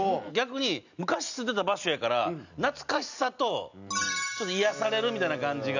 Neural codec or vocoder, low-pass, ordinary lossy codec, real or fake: none; 7.2 kHz; none; real